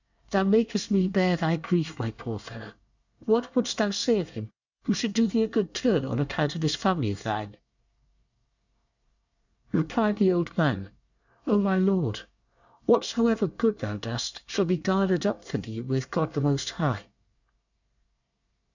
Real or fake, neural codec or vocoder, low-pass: fake; codec, 24 kHz, 1 kbps, SNAC; 7.2 kHz